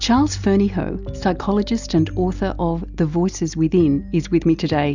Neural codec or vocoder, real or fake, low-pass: none; real; 7.2 kHz